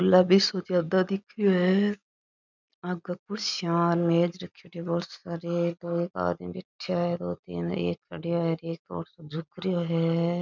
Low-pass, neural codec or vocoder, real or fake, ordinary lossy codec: 7.2 kHz; none; real; none